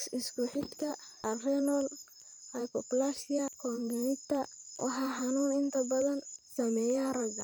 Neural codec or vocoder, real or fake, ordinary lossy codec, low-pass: vocoder, 44.1 kHz, 128 mel bands every 512 samples, BigVGAN v2; fake; none; none